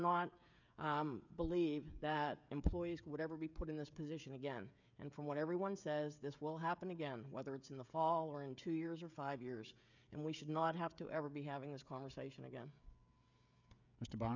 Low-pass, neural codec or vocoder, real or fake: 7.2 kHz; codec, 16 kHz, 16 kbps, FreqCodec, smaller model; fake